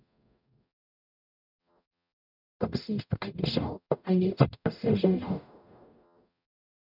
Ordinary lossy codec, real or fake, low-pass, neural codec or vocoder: none; fake; 5.4 kHz; codec, 44.1 kHz, 0.9 kbps, DAC